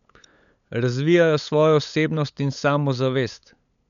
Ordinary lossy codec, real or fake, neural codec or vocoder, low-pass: none; fake; codec, 16 kHz, 8 kbps, FunCodec, trained on LibriTTS, 25 frames a second; 7.2 kHz